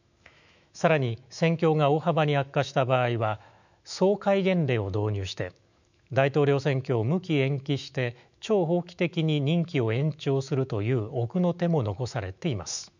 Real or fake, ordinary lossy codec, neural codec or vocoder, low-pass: fake; none; autoencoder, 48 kHz, 128 numbers a frame, DAC-VAE, trained on Japanese speech; 7.2 kHz